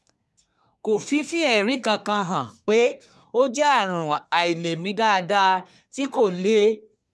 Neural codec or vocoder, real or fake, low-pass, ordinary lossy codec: codec, 24 kHz, 1 kbps, SNAC; fake; none; none